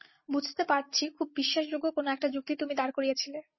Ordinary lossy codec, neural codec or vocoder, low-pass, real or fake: MP3, 24 kbps; none; 7.2 kHz; real